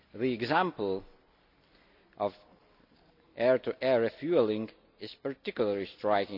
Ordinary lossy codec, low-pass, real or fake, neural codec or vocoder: none; 5.4 kHz; real; none